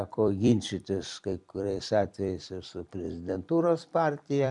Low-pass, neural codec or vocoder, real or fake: 10.8 kHz; vocoder, 44.1 kHz, 128 mel bands every 256 samples, BigVGAN v2; fake